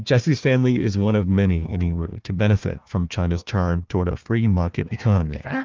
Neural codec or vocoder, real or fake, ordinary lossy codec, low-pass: codec, 16 kHz, 1 kbps, FunCodec, trained on Chinese and English, 50 frames a second; fake; Opus, 24 kbps; 7.2 kHz